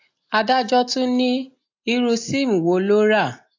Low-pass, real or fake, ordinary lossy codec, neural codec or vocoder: 7.2 kHz; real; AAC, 48 kbps; none